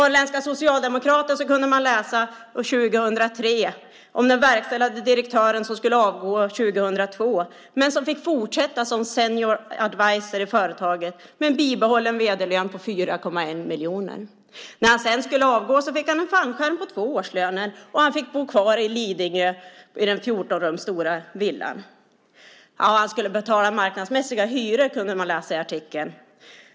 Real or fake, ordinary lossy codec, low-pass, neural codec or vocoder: real; none; none; none